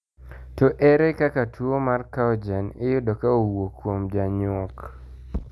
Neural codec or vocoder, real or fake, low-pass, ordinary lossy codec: none; real; none; none